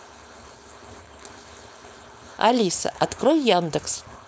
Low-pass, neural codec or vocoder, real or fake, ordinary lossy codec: none; codec, 16 kHz, 4.8 kbps, FACodec; fake; none